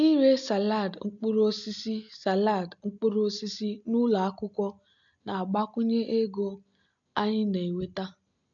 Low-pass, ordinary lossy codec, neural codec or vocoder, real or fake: 7.2 kHz; AAC, 64 kbps; none; real